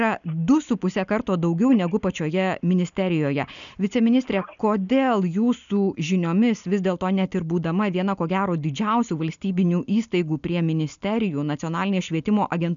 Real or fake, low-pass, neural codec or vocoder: real; 7.2 kHz; none